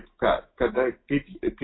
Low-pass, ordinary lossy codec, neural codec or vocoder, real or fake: 7.2 kHz; AAC, 16 kbps; autoencoder, 48 kHz, 128 numbers a frame, DAC-VAE, trained on Japanese speech; fake